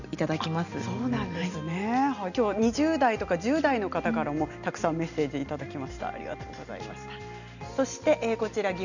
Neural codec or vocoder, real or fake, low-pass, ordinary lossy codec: none; real; 7.2 kHz; none